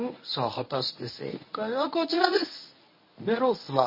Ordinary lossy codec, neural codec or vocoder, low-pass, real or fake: MP3, 24 kbps; codec, 24 kHz, 0.9 kbps, WavTokenizer, medium speech release version 1; 5.4 kHz; fake